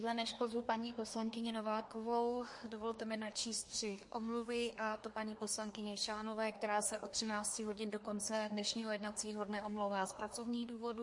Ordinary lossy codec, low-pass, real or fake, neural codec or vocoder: MP3, 48 kbps; 10.8 kHz; fake; codec, 24 kHz, 1 kbps, SNAC